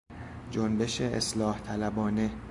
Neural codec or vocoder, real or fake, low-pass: none; real; 10.8 kHz